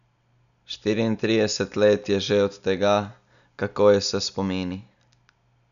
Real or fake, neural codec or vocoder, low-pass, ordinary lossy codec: real; none; 7.2 kHz; none